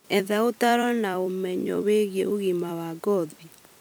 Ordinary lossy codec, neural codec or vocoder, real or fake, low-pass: none; vocoder, 44.1 kHz, 128 mel bands every 256 samples, BigVGAN v2; fake; none